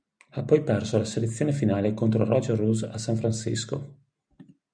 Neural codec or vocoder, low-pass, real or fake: none; 9.9 kHz; real